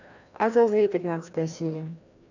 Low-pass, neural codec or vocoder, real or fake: 7.2 kHz; codec, 16 kHz, 1 kbps, FreqCodec, larger model; fake